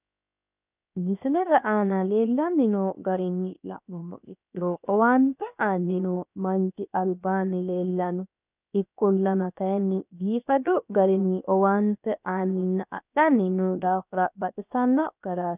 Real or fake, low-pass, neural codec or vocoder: fake; 3.6 kHz; codec, 16 kHz, 0.7 kbps, FocalCodec